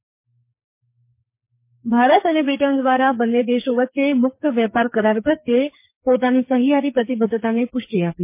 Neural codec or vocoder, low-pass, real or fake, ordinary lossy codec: codec, 44.1 kHz, 2.6 kbps, SNAC; 3.6 kHz; fake; MP3, 24 kbps